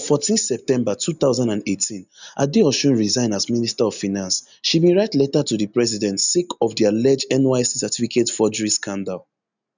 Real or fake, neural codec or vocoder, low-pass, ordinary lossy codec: real; none; 7.2 kHz; none